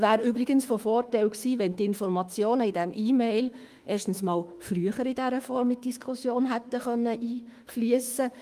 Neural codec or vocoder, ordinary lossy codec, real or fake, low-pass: autoencoder, 48 kHz, 32 numbers a frame, DAC-VAE, trained on Japanese speech; Opus, 32 kbps; fake; 14.4 kHz